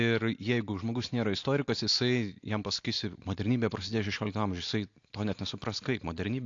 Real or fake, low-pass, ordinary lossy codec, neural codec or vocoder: real; 7.2 kHz; AAC, 48 kbps; none